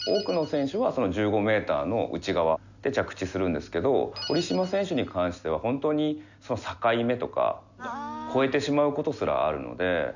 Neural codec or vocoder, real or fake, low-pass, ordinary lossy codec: none; real; 7.2 kHz; none